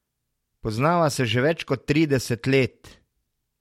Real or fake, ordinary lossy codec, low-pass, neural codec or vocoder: real; MP3, 64 kbps; 19.8 kHz; none